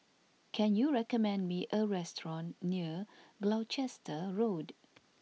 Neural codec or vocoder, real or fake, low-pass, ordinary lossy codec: none; real; none; none